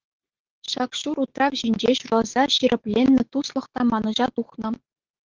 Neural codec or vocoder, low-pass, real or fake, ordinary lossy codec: autoencoder, 48 kHz, 128 numbers a frame, DAC-VAE, trained on Japanese speech; 7.2 kHz; fake; Opus, 16 kbps